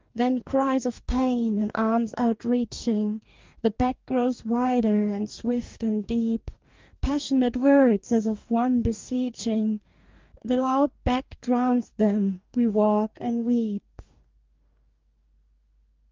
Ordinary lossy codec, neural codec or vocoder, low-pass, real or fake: Opus, 24 kbps; codec, 44.1 kHz, 2.6 kbps, DAC; 7.2 kHz; fake